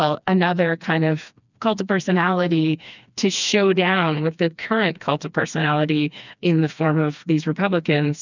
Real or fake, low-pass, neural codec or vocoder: fake; 7.2 kHz; codec, 16 kHz, 2 kbps, FreqCodec, smaller model